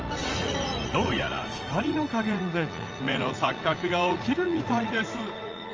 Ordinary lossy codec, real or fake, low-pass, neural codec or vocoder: Opus, 24 kbps; fake; 7.2 kHz; vocoder, 44.1 kHz, 80 mel bands, Vocos